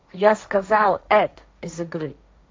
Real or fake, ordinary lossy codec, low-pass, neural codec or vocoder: fake; none; none; codec, 16 kHz, 1.1 kbps, Voila-Tokenizer